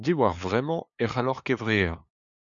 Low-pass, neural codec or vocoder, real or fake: 7.2 kHz; codec, 16 kHz, 2 kbps, FunCodec, trained on LibriTTS, 25 frames a second; fake